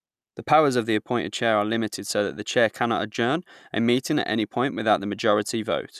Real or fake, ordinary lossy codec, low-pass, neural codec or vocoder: real; none; 14.4 kHz; none